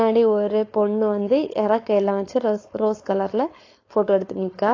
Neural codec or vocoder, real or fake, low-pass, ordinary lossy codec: codec, 16 kHz, 4.8 kbps, FACodec; fake; 7.2 kHz; AAC, 32 kbps